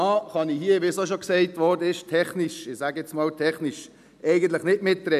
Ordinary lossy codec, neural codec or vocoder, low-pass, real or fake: none; none; 14.4 kHz; real